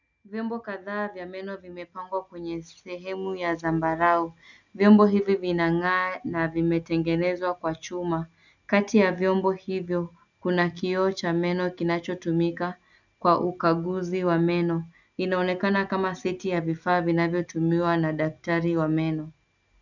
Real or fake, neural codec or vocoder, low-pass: real; none; 7.2 kHz